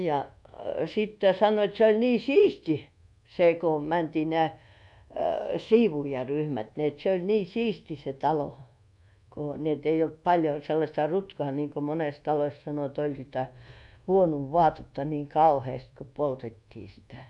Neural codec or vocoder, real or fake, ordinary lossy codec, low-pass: codec, 24 kHz, 1.2 kbps, DualCodec; fake; none; 10.8 kHz